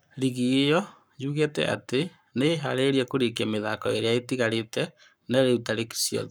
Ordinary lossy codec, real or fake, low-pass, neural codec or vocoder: none; fake; none; codec, 44.1 kHz, 7.8 kbps, Pupu-Codec